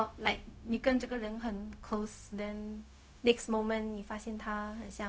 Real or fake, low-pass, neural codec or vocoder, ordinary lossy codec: fake; none; codec, 16 kHz, 0.4 kbps, LongCat-Audio-Codec; none